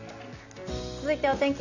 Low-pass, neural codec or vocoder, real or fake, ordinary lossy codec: 7.2 kHz; none; real; none